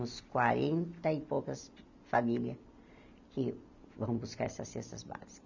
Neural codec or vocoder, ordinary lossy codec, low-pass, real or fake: none; none; 7.2 kHz; real